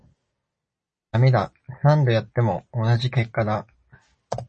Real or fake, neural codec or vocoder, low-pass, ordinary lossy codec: real; none; 10.8 kHz; MP3, 32 kbps